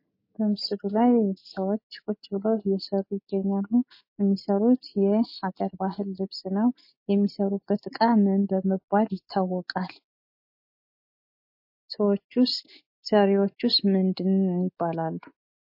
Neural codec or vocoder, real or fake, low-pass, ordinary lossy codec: none; real; 5.4 kHz; MP3, 32 kbps